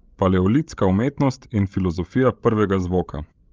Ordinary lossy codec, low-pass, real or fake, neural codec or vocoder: Opus, 24 kbps; 7.2 kHz; fake; codec, 16 kHz, 16 kbps, FreqCodec, larger model